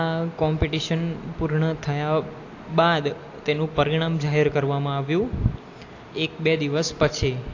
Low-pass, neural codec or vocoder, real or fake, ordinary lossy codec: 7.2 kHz; none; real; AAC, 48 kbps